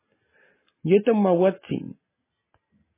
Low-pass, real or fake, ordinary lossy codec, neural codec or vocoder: 3.6 kHz; real; MP3, 16 kbps; none